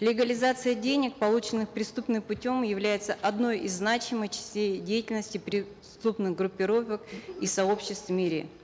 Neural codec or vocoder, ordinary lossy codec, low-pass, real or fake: none; none; none; real